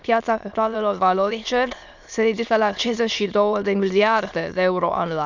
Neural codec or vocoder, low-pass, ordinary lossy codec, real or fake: autoencoder, 22.05 kHz, a latent of 192 numbers a frame, VITS, trained on many speakers; 7.2 kHz; none; fake